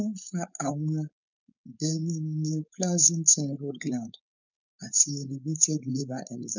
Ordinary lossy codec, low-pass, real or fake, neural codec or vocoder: none; 7.2 kHz; fake; codec, 16 kHz, 4.8 kbps, FACodec